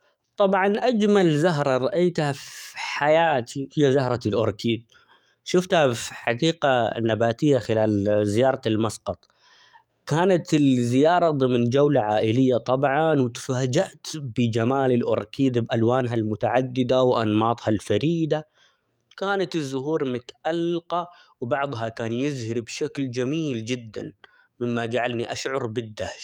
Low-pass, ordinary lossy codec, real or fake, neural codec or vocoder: 19.8 kHz; none; fake; codec, 44.1 kHz, 7.8 kbps, DAC